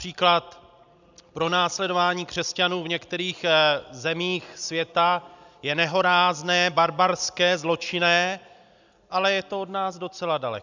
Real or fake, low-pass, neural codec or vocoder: real; 7.2 kHz; none